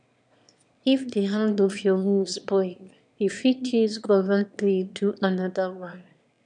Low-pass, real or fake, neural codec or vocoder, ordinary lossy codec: 9.9 kHz; fake; autoencoder, 22.05 kHz, a latent of 192 numbers a frame, VITS, trained on one speaker; none